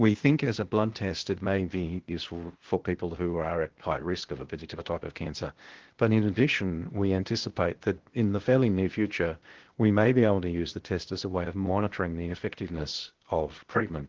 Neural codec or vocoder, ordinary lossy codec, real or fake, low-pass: codec, 16 kHz, 0.8 kbps, ZipCodec; Opus, 16 kbps; fake; 7.2 kHz